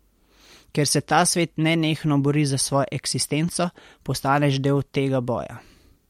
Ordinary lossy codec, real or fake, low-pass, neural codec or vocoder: MP3, 64 kbps; real; 19.8 kHz; none